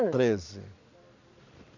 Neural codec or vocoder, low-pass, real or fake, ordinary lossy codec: none; 7.2 kHz; real; none